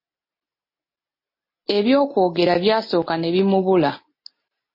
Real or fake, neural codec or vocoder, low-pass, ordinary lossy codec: real; none; 5.4 kHz; MP3, 24 kbps